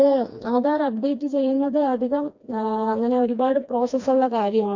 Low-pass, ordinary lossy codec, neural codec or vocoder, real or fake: 7.2 kHz; MP3, 48 kbps; codec, 16 kHz, 2 kbps, FreqCodec, smaller model; fake